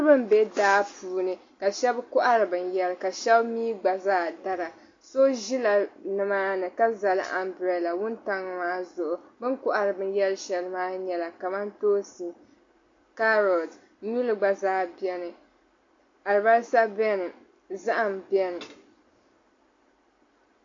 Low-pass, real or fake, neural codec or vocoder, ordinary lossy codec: 7.2 kHz; real; none; AAC, 48 kbps